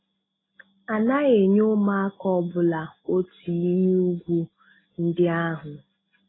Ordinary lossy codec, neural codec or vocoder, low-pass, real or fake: AAC, 16 kbps; none; 7.2 kHz; real